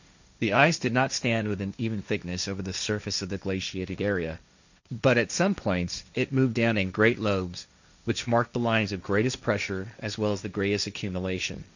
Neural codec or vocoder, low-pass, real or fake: codec, 16 kHz, 1.1 kbps, Voila-Tokenizer; 7.2 kHz; fake